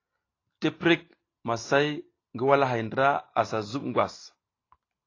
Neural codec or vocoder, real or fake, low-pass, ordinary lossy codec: none; real; 7.2 kHz; AAC, 32 kbps